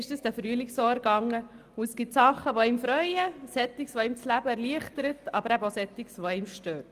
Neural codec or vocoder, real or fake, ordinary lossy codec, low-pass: none; real; Opus, 32 kbps; 14.4 kHz